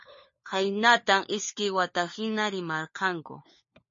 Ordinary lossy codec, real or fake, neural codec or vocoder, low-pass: MP3, 32 kbps; fake; codec, 16 kHz, 4 kbps, FunCodec, trained on LibriTTS, 50 frames a second; 7.2 kHz